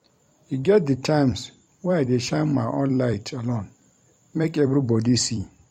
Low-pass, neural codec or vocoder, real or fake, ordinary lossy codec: 19.8 kHz; vocoder, 44.1 kHz, 128 mel bands every 256 samples, BigVGAN v2; fake; MP3, 64 kbps